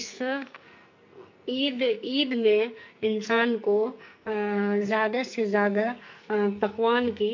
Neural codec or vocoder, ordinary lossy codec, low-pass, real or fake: codec, 44.1 kHz, 2.6 kbps, SNAC; MP3, 48 kbps; 7.2 kHz; fake